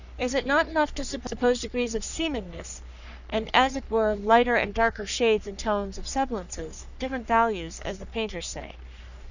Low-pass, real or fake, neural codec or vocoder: 7.2 kHz; fake; codec, 44.1 kHz, 3.4 kbps, Pupu-Codec